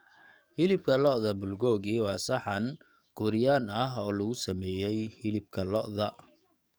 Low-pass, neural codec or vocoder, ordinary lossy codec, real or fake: none; codec, 44.1 kHz, 7.8 kbps, DAC; none; fake